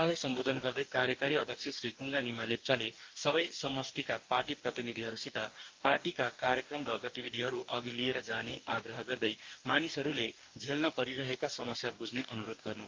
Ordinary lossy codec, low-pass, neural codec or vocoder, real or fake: Opus, 32 kbps; 7.2 kHz; codec, 44.1 kHz, 2.6 kbps, DAC; fake